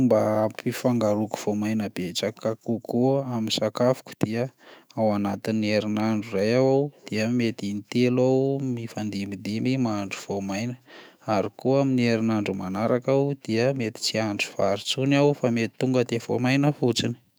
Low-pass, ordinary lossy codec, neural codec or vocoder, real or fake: none; none; autoencoder, 48 kHz, 128 numbers a frame, DAC-VAE, trained on Japanese speech; fake